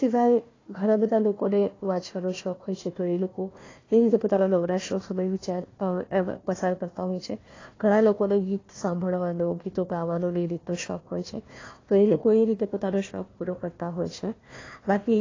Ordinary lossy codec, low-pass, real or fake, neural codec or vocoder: AAC, 32 kbps; 7.2 kHz; fake; codec, 16 kHz, 1 kbps, FunCodec, trained on Chinese and English, 50 frames a second